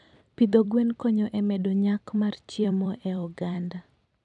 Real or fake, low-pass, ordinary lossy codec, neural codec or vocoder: fake; 10.8 kHz; none; vocoder, 44.1 kHz, 128 mel bands every 256 samples, BigVGAN v2